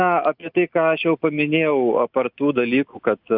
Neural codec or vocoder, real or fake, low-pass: none; real; 5.4 kHz